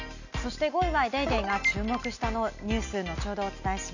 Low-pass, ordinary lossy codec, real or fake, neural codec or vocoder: 7.2 kHz; none; real; none